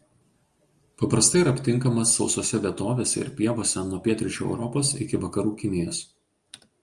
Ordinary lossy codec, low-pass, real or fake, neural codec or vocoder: Opus, 32 kbps; 10.8 kHz; real; none